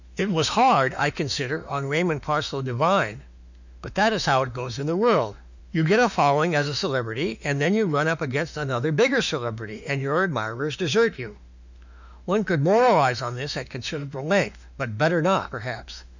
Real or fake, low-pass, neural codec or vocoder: fake; 7.2 kHz; autoencoder, 48 kHz, 32 numbers a frame, DAC-VAE, trained on Japanese speech